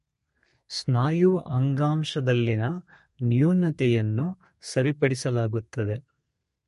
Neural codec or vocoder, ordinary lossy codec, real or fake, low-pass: codec, 32 kHz, 1.9 kbps, SNAC; MP3, 48 kbps; fake; 14.4 kHz